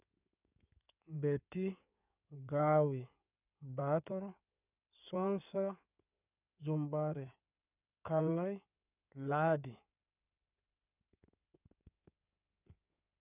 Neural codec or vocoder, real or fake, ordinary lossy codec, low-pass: codec, 16 kHz in and 24 kHz out, 2.2 kbps, FireRedTTS-2 codec; fake; none; 3.6 kHz